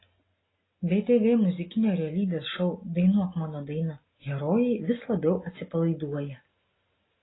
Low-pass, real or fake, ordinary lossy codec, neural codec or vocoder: 7.2 kHz; real; AAC, 16 kbps; none